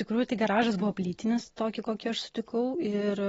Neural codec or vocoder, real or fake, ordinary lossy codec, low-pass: none; real; AAC, 24 kbps; 19.8 kHz